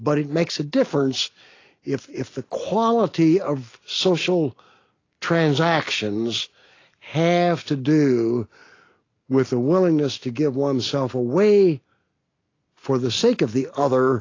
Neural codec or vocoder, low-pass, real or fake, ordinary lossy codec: none; 7.2 kHz; real; AAC, 32 kbps